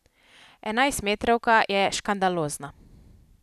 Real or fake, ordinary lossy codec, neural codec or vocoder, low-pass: real; none; none; 14.4 kHz